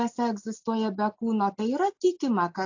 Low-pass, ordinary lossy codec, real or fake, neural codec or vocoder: 7.2 kHz; AAC, 48 kbps; real; none